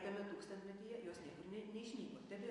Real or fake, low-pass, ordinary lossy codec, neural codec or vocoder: real; 10.8 kHz; MP3, 48 kbps; none